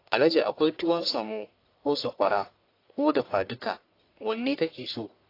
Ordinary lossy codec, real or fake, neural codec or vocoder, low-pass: AAC, 32 kbps; fake; codec, 44.1 kHz, 1.7 kbps, Pupu-Codec; 5.4 kHz